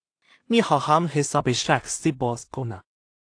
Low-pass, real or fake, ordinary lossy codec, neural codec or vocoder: 9.9 kHz; fake; AAC, 48 kbps; codec, 16 kHz in and 24 kHz out, 0.4 kbps, LongCat-Audio-Codec, two codebook decoder